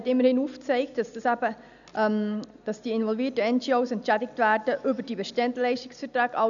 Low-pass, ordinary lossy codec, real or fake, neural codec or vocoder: 7.2 kHz; none; real; none